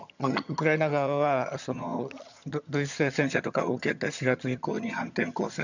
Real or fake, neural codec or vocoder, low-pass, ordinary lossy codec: fake; vocoder, 22.05 kHz, 80 mel bands, HiFi-GAN; 7.2 kHz; none